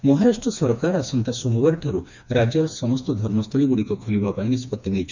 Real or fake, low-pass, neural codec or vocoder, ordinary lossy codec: fake; 7.2 kHz; codec, 16 kHz, 2 kbps, FreqCodec, smaller model; none